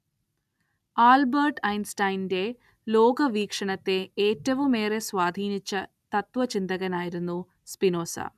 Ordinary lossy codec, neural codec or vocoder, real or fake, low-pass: none; none; real; 14.4 kHz